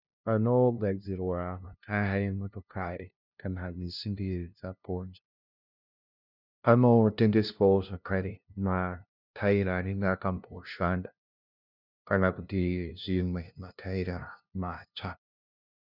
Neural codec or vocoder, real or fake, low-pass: codec, 16 kHz, 0.5 kbps, FunCodec, trained on LibriTTS, 25 frames a second; fake; 5.4 kHz